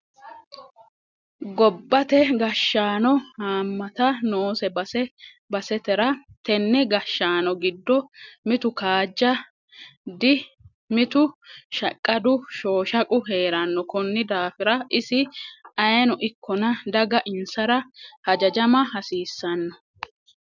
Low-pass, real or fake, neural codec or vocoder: 7.2 kHz; real; none